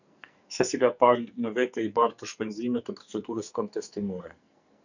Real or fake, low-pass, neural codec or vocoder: fake; 7.2 kHz; codec, 44.1 kHz, 2.6 kbps, SNAC